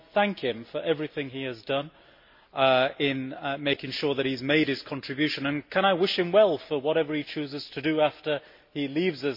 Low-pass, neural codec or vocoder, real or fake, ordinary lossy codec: 5.4 kHz; none; real; MP3, 32 kbps